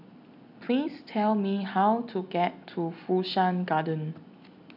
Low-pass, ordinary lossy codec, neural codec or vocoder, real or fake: 5.4 kHz; none; none; real